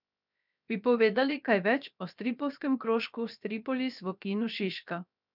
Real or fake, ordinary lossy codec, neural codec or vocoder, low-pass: fake; none; codec, 16 kHz, 0.7 kbps, FocalCodec; 5.4 kHz